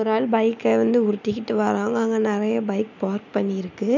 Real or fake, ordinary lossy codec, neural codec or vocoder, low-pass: real; none; none; 7.2 kHz